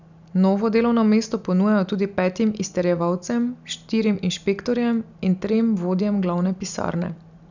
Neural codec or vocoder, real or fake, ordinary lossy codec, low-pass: none; real; none; 7.2 kHz